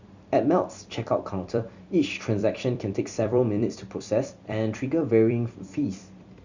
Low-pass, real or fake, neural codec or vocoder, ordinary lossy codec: 7.2 kHz; fake; vocoder, 44.1 kHz, 128 mel bands every 256 samples, BigVGAN v2; Opus, 64 kbps